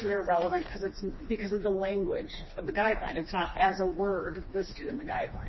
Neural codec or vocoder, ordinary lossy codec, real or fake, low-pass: codec, 16 kHz, 2 kbps, FreqCodec, smaller model; MP3, 24 kbps; fake; 7.2 kHz